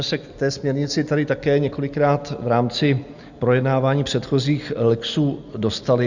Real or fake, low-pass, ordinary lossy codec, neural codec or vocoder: real; 7.2 kHz; Opus, 64 kbps; none